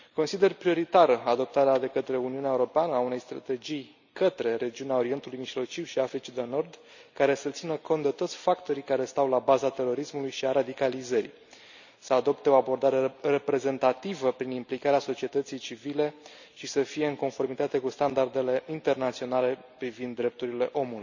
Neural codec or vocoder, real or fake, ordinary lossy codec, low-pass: none; real; none; 7.2 kHz